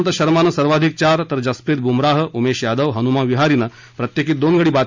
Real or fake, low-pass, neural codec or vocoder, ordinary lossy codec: real; 7.2 kHz; none; MP3, 64 kbps